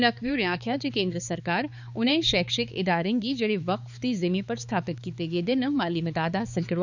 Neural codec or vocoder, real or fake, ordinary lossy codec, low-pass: codec, 16 kHz, 4 kbps, X-Codec, HuBERT features, trained on balanced general audio; fake; none; 7.2 kHz